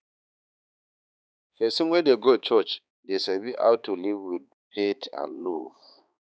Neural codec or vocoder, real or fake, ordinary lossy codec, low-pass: codec, 16 kHz, 4 kbps, X-Codec, HuBERT features, trained on balanced general audio; fake; none; none